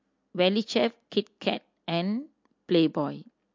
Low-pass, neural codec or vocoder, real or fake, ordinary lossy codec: 7.2 kHz; none; real; MP3, 48 kbps